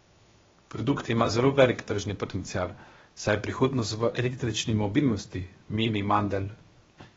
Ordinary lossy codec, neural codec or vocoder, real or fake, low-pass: AAC, 24 kbps; codec, 16 kHz, 0.7 kbps, FocalCodec; fake; 7.2 kHz